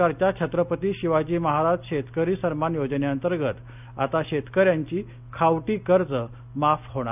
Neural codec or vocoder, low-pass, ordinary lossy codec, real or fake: none; 3.6 kHz; none; real